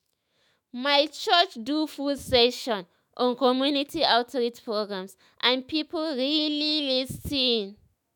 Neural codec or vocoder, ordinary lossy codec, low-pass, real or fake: autoencoder, 48 kHz, 128 numbers a frame, DAC-VAE, trained on Japanese speech; none; 19.8 kHz; fake